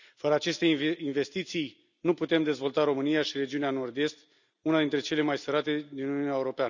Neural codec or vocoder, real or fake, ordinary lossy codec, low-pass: none; real; none; 7.2 kHz